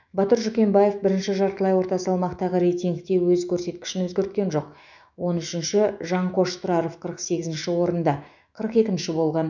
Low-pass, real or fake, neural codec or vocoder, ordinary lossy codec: 7.2 kHz; fake; autoencoder, 48 kHz, 128 numbers a frame, DAC-VAE, trained on Japanese speech; none